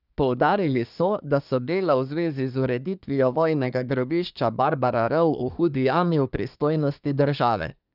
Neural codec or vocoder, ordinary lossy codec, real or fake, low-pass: codec, 24 kHz, 1 kbps, SNAC; none; fake; 5.4 kHz